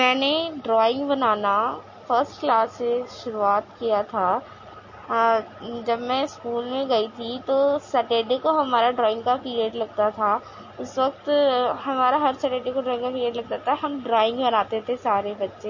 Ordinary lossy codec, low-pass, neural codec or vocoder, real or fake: MP3, 32 kbps; 7.2 kHz; none; real